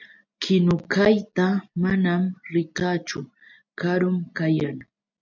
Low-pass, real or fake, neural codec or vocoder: 7.2 kHz; real; none